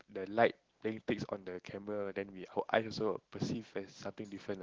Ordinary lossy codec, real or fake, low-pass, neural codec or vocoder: Opus, 16 kbps; real; 7.2 kHz; none